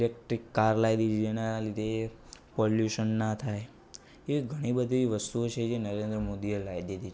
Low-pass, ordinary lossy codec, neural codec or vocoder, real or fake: none; none; none; real